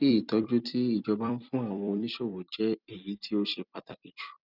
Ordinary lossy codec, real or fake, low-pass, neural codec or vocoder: none; fake; 5.4 kHz; vocoder, 44.1 kHz, 128 mel bands every 256 samples, BigVGAN v2